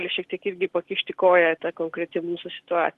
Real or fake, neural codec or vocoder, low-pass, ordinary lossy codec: real; none; 14.4 kHz; Opus, 16 kbps